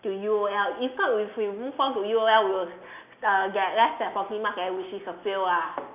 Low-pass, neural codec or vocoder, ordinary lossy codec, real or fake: 3.6 kHz; none; none; real